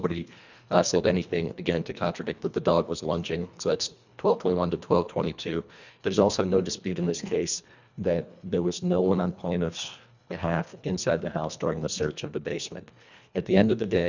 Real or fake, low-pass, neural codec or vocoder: fake; 7.2 kHz; codec, 24 kHz, 1.5 kbps, HILCodec